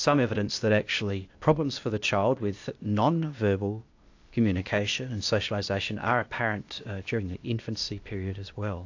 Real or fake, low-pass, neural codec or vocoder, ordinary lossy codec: fake; 7.2 kHz; codec, 16 kHz, 0.8 kbps, ZipCodec; AAC, 48 kbps